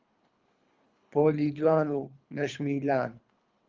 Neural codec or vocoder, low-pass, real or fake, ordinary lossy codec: codec, 24 kHz, 3 kbps, HILCodec; 7.2 kHz; fake; Opus, 32 kbps